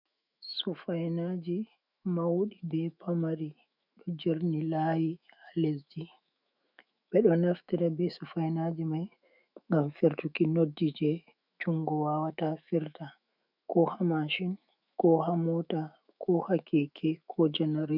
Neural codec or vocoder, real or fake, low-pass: autoencoder, 48 kHz, 128 numbers a frame, DAC-VAE, trained on Japanese speech; fake; 5.4 kHz